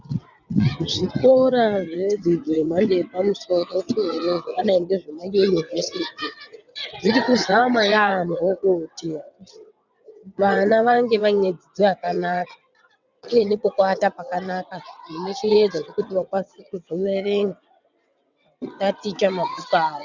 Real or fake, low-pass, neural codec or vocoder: fake; 7.2 kHz; vocoder, 22.05 kHz, 80 mel bands, WaveNeXt